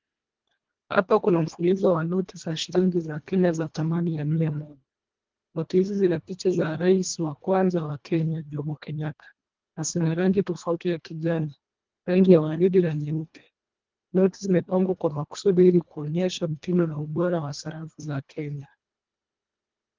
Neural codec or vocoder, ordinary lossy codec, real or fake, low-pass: codec, 24 kHz, 1.5 kbps, HILCodec; Opus, 32 kbps; fake; 7.2 kHz